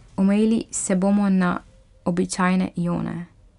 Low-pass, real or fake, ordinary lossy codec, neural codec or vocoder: 10.8 kHz; real; none; none